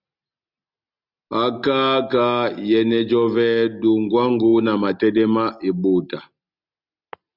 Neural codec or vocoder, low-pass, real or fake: none; 5.4 kHz; real